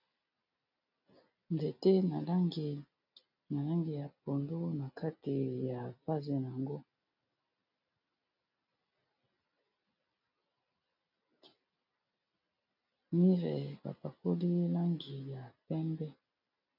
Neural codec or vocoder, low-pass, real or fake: none; 5.4 kHz; real